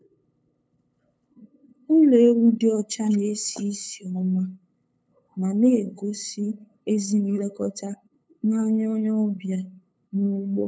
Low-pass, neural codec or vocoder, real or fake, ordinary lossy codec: none; codec, 16 kHz, 8 kbps, FunCodec, trained on LibriTTS, 25 frames a second; fake; none